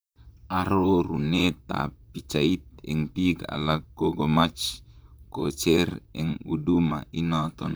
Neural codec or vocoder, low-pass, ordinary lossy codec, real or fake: vocoder, 44.1 kHz, 128 mel bands, Pupu-Vocoder; none; none; fake